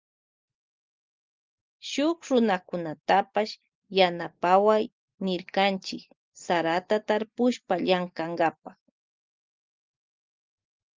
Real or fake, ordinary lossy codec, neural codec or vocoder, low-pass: real; Opus, 32 kbps; none; 7.2 kHz